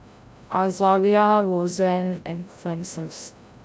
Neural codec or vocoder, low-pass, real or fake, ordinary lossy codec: codec, 16 kHz, 0.5 kbps, FreqCodec, larger model; none; fake; none